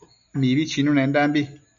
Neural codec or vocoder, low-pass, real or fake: none; 7.2 kHz; real